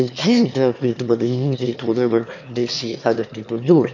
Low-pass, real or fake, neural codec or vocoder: 7.2 kHz; fake; autoencoder, 22.05 kHz, a latent of 192 numbers a frame, VITS, trained on one speaker